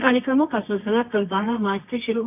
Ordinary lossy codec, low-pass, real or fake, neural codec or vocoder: none; 3.6 kHz; fake; codec, 24 kHz, 0.9 kbps, WavTokenizer, medium music audio release